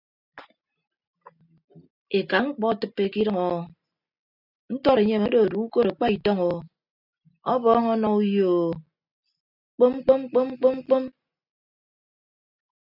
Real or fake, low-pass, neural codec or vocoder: real; 5.4 kHz; none